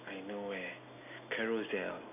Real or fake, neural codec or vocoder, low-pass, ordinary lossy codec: real; none; 3.6 kHz; none